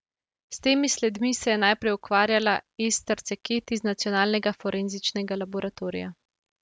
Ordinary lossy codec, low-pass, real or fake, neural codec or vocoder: none; none; real; none